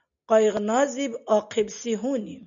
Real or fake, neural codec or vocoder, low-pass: real; none; 7.2 kHz